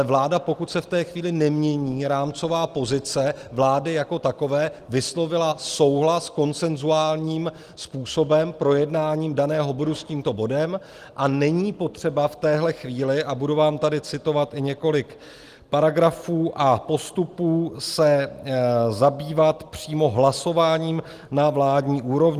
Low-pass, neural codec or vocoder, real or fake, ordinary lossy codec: 14.4 kHz; none; real; Opus, 24 kbps